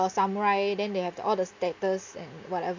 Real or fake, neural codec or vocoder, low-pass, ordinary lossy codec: real; none; 7.2 kHz; MP3, 64 kbps